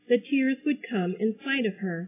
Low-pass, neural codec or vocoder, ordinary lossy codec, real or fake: 3.6 kHz; none; AAC, 16 kbps; real